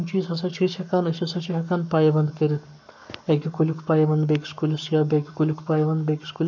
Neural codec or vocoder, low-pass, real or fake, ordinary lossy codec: codec, 44.1 kHz, 7.8 kbps, Pupu-Codec; 7.2 kHz; fake; none